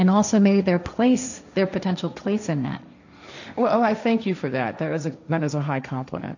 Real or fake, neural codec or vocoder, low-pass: fake; codec, 16 kHz, 1.1 kbps, Voila-Tokenizer; 7.2 kHz